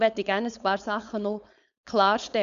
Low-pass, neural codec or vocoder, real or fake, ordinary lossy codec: 7.2 kHz; codec, 16 kHz, 4.8 kbps, FACodec; fake; none